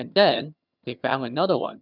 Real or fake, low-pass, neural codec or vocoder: fake; 5.4 kHz; vocoder, 22.05 kHz, 80 mel bands, HiFi-GAN